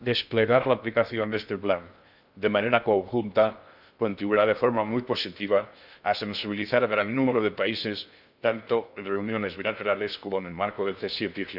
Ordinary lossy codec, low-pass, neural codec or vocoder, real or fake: none; 5.4 kHz; codec, 16 kHz in and 24 kHz out, 0.6 kbps, FocalCodec, streaming, 2048 codes; fake